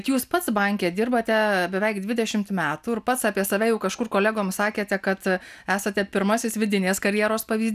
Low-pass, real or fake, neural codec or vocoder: 14.4 kHz; real; none